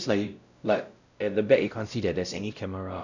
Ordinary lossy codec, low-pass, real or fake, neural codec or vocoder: none; 7.2 kHz; fake; codec, 16 kHz, 0.5 kbps, X-Codec, WavLM features, trained on Multilingual LibriSpeech